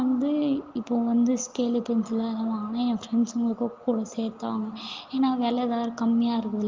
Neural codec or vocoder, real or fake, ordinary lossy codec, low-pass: none; real; Opus, 24 kbps; 7.2 kHz